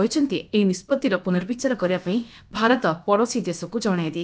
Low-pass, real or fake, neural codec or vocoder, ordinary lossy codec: none; fake; codec, 16 kHz, about 1 kbps, DyCAST, with the encoder's durations; none